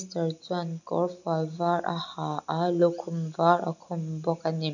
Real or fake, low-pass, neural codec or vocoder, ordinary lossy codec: real; 7.2 kHz; none; none